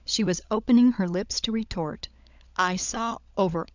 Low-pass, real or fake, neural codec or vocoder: 7.2 kHz; fake; codec, 16 kHz, 16 kbps, FunCodec, trained on LibriTTS, 50 frames a second